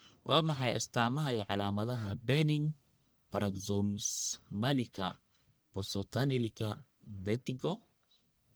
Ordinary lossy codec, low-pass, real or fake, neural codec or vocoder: none; none; fake; codec, 44.1 kHz, 1.7 kbps, Pupu-Codec